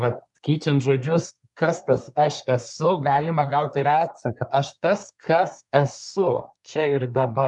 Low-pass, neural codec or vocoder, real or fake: 10.8 kHz; codec, 24 kHz, 1 kbps, SNAC; fake